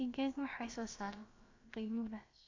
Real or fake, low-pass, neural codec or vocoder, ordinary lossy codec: fake; 7.2 kHz; codec, 16 kHz, about 1 kbps, DyCAST, with the encoder's durations; AAC, 32 kbps